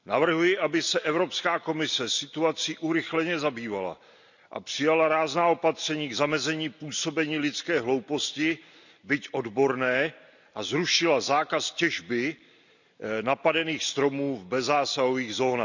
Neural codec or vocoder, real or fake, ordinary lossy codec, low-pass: none; real; none; 7.2 kHz